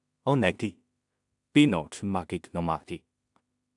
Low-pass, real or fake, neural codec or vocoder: 10.8 kHz; fake; codec, 16 kHz in and 24 kHz out, 0.4 kbps, LongCat-Audio-Codec, two codebook decoder